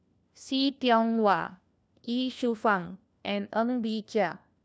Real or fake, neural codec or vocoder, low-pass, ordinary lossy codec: fake; codec, 16 kHz, 1 kbps, FunCodec, trained on LibriTTS, 50 frames a second; none; none